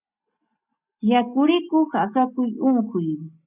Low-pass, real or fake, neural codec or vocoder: 3.6 kHz; real; none